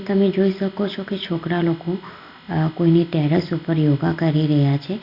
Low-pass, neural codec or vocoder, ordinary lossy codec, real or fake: 5.4 kHz; none; Opus, 64 kbps; real